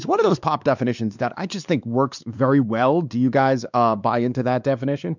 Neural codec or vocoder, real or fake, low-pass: codec, 16 kHz, 2 kbps, X-Codec, WavLM features, trained on Multilingual LibriSpeech; fake; 7.2 kHz